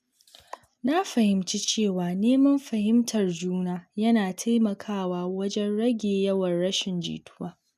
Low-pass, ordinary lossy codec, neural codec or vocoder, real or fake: 14.4 kHz; none; none; real